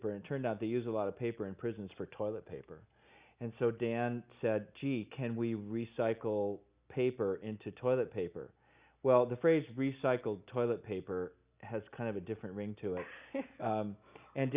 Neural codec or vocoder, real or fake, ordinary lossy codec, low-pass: none; real; Opus, 64 kbps; 3.6 kHz